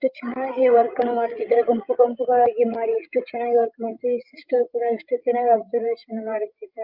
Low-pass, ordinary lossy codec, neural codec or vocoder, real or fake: 5.4 kHz; Opus, 32 kbps; codec, 16 kHz, 16 kbps, FreqCodec, larger model; fake